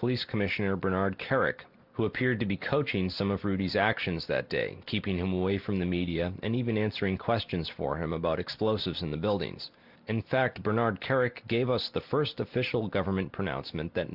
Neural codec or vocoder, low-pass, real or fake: none; 5.4 kHz; real